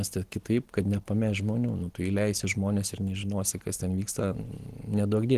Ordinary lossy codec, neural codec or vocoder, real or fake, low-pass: Opus, 16 kbps; none; real; 14.4 kHz